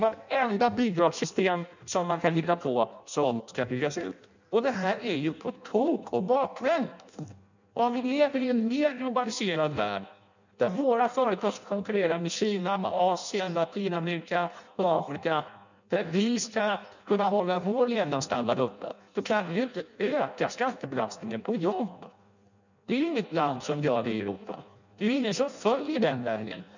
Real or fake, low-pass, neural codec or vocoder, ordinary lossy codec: fake; 7.2 kHz; codec, 16 kHz in and 24 kHz out, 0.6 kbps, FireRedTTS-2 codec; none